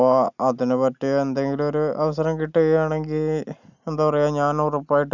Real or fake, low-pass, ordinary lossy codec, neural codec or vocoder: real; 7.2 kHz; none; none